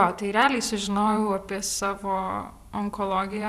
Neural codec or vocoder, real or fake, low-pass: vocoder, 44.1 kHz, 128 mel bands every 512 samples, BigVGAN v2; fake; 14.4 kHz